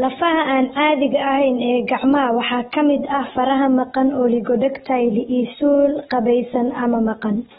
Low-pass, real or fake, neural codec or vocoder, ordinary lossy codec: 10.8 kHz; real; none; AAC, 16 kbps